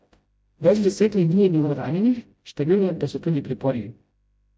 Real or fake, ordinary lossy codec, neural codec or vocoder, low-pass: fake; none; codec, 16 kHz, 0.5 kbps, FreqCodec, smaller model; none